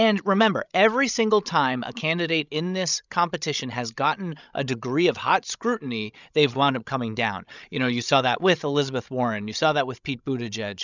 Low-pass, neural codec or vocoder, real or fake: 7.2 kHz; codec, 16 kHz, 16 kbps, FreqCodec, larger model; fake